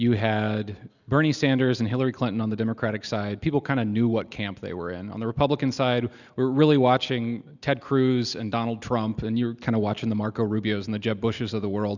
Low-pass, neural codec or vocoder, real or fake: 7.2 kHz; none; real